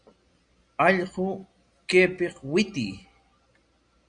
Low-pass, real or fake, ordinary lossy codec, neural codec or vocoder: 9.9 kHz; real; Opus, 64 kbps; none